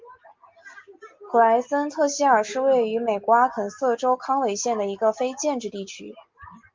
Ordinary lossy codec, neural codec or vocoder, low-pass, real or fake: Opus, 32 kbps; none; 7.2 kHz; real